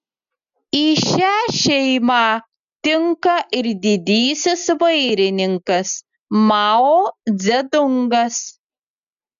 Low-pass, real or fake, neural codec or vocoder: 7.2 kHz; real; none